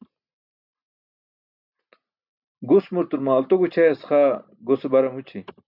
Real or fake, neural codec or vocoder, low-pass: real; none; 5.4 kHz